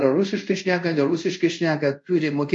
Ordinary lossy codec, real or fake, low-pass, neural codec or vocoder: MP3, 48 kbps; fake; 10.8 kHz; codec, 24 kHz, 0.5 kbps, DualCodec